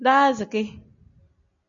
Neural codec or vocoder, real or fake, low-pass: none; real; 7.2 kHz